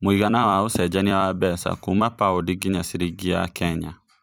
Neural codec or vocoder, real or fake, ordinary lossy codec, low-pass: vocoder, 44.1 kHz, 128 mel bands every 256 samples, BigVGAN v2; fake; none; none